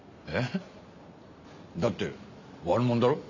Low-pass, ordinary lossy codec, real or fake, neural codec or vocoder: 7.2 kHz; none; real; none